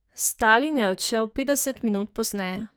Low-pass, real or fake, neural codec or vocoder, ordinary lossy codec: none; fake; codec, 44.1 kHz, 2.6 kbps, SNAC; none